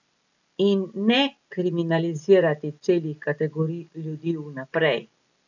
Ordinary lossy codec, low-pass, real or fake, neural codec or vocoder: none; 7.2 kHz; real; none